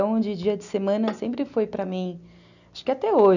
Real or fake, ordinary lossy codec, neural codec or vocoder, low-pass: real; none; none; 7.2 kHz